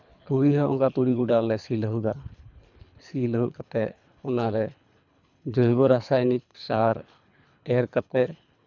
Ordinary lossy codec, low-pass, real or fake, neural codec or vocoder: none; 7.2 kHz; fake; codec, 24 kHz, 3 kbps, HILCodec